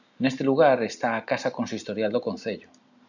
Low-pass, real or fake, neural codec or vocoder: 7.2 kHz; real; none